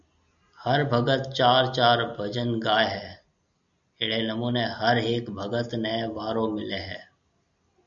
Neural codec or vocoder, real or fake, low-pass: none; real; 7.2 kHz